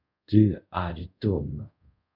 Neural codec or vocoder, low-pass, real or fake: codec, 24 kHz, 0.5 kbps, DualCodec; 5.4 kHz; fake